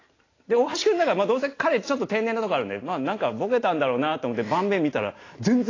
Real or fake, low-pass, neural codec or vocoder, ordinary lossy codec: real; 7.2 kHz; none; AAC, 32 kbps